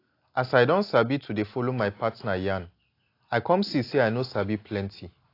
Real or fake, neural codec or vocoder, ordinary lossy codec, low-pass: real; none; AAC, 32 kbps; 5.4 kHz